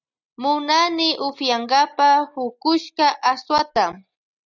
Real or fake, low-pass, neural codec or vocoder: real; 7.2 kHz; none